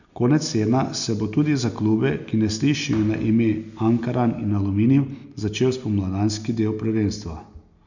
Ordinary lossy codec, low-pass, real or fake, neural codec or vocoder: none; 7.2 kHz; real; none